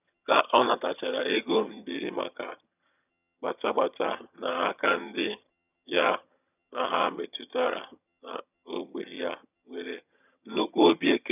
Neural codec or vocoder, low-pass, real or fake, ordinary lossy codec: vocoder, 22.05 kHz, 80 mel bands, HiFi-GAN; 3.6 kHz; fake; none